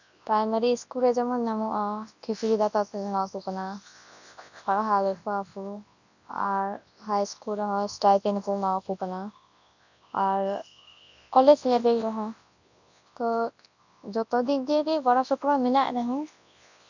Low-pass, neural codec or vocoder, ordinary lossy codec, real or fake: 7.2 kHz; codec, 24 kHz, 0.9 kbps, WavTokenizer, large speech release; none; fake